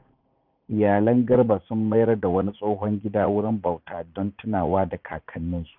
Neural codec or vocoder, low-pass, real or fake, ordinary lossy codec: vocoder, 44.1 kHz, 80 mel bands, Vocos; 5.4 kHz; fake; none